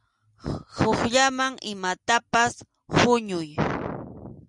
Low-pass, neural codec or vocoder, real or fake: 10.8 kHz; none; real